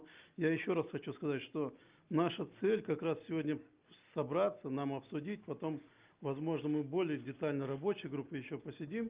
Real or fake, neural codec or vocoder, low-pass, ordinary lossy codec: real; none; 3.6 kHz; Opus, 32 kbps